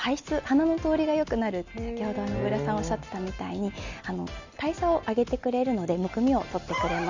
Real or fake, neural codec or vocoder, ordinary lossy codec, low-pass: real; none; Opus, 64 kbps; 7.2 kHz